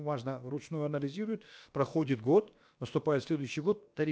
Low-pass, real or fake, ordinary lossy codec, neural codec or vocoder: none; fake; none; codec, 16 kHz, about 1 kbps, DyCAST, with the encoder's durations